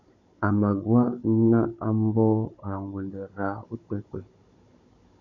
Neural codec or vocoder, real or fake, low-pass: codec, 16 kHz, 16 kbps, FunCodec, trained on Chinese and English, 50 frames a second; fake; 7.2 kHz